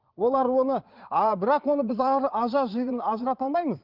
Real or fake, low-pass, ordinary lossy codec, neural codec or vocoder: fake; 5.4 kHz; Opus, 32 kbps; vocoder, 22.05 kHz, 80 mel bands, Vocos